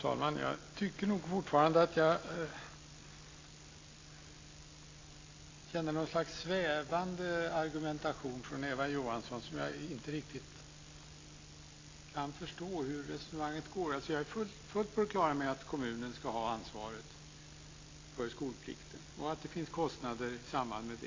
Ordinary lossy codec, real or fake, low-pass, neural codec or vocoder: AAC, 32 kbps; real; 7.2 kHz; none